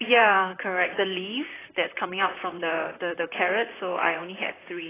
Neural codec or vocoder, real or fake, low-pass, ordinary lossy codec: vocoder, 44.1 kHz, 128 mel bands, Pupu-Vocoder; fake; 3.6 kHz; AAC, 16 kbps